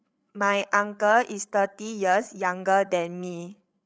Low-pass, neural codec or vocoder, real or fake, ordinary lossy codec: none; codec, 16 kHz, 8 kbps, FreqCodec, larger model; fake; none